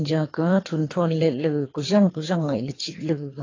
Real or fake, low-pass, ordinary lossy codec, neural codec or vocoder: fake; 7.2 kHz; AAC, 32 kbps; codec, 24 kHz, 3 kbps, HILCodec